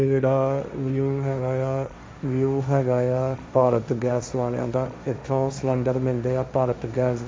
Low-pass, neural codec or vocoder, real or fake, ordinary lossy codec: none; codec, 16 kHz, 1.1 kbps, Voila-Tokenizer; fake; none